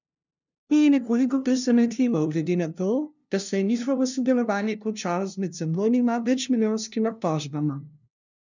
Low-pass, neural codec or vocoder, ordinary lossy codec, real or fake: 7.2 kHz; codec, 16 kHz, 0.5 kbps, FunCodec, trained on LibriTTS, 25 frames a second; none; fake